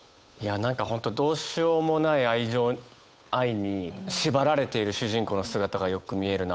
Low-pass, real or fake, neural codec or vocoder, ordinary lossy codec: none; fake; codec, 16 kHz, 8 kbps, FunCodec, trained on Chinese and English, 25 frames a second; none